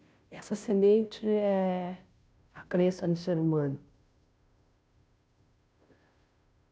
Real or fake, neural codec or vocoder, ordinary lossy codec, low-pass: fake; codec, 16 kHz, 0.5 kbps, FunCodec, trained on Chinese and English, 25 frames a second; none; none